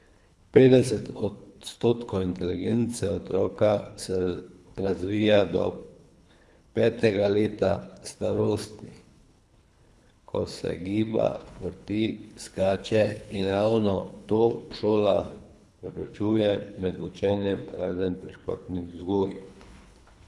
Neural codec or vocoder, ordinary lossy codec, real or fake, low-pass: codec, 24 kHz, 3 kbps, HILCodec; none; fake; none